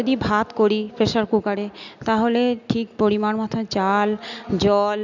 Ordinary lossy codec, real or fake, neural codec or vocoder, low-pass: none; real; none; 7.2 kHz